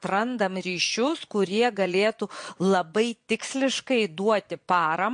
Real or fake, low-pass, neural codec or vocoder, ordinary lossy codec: fake; 9.9 kHz; vocoder, 22.05 kHz, 80 mel bands, Vocos; MP3, 48 kbps